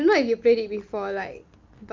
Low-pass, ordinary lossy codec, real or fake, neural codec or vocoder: 7.2 kHz; Opus, 24 kbps; real; none